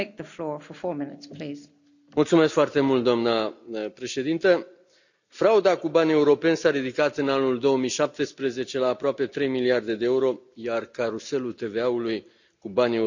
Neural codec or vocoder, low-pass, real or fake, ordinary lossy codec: none; 7.2 kHz; real; none